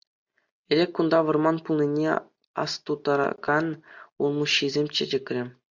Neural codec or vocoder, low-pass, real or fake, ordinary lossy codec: none; 7.2 kHz; real; MP3, 64 kbps